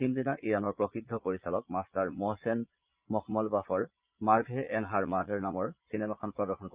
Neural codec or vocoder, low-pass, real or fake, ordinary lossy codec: vocoder, 22.05 kHz, 80 mel bands, Vocos; 3.6 kHz; fake; Opus, 24 kbps